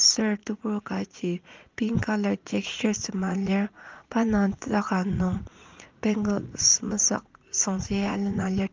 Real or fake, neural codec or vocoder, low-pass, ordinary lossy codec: fake; vocoder, 44.1 kHz, 80 mel bands, Vocos; 7.2 kHz; Opus, 32 kbps